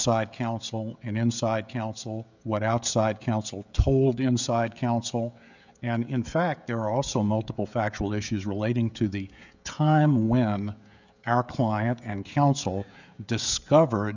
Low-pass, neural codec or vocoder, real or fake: 7.2 kHz; codec, 16 kHz, 16 kbps, FreqCodec, smaller model; fake